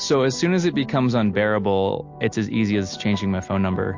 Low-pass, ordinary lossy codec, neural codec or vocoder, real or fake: 7.2 kHz; MP3, 48 kbps; none; real